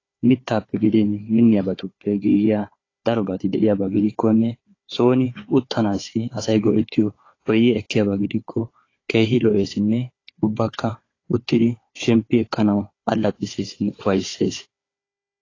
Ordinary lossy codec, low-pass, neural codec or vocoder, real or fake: AAC, 32 kbps; 7.2 kHz; codec, 16 kHz, 4 kbps, FunCodec, trained on Chinese and English, 50 frames a second; fake